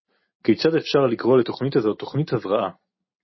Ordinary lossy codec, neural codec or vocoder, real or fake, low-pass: MP3, 24 kbps; none; real; 7.2 kHz